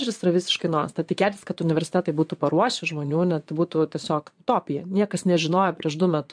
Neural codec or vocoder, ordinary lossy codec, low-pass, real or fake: vocoder, 22.05 kHz, 80 mel bands, Vocos; MP3, 64 kbps; 9.9 kHz; fake